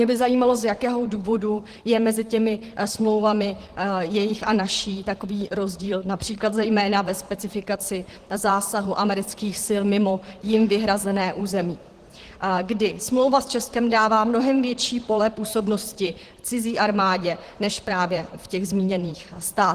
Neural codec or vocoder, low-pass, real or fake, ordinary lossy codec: vocoder, 44.1 kHz, 128 mel bands, Pupu-Vocoder; 14.4 kHz; fake; Opus, 16 kbps